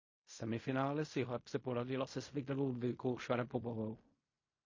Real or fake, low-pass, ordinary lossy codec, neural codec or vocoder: fake; 7.2 kHz; MP3, 32 kbps; codec, 16 kHz in and 24 kHz out, 0.4 kbps, LongCat-Audio-Codec, fine tuned four codebook decoder